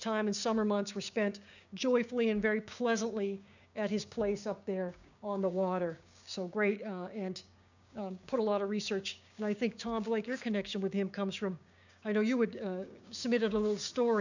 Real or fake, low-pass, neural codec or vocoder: fake; 7.2 kHz; codec, 16 kHz, 6 kbps, DAC